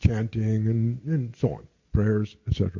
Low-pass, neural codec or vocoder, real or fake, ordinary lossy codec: 7.2 kHz; none; real; MP3, 48 kbps